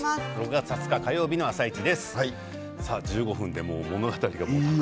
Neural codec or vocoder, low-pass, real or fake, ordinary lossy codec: none; none; real; none